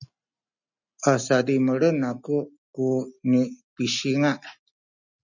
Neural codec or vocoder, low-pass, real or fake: none; 7.2 kHz; real